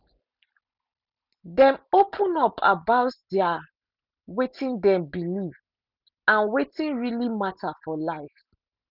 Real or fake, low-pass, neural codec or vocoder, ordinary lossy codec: real; 5.4 kHz; none; none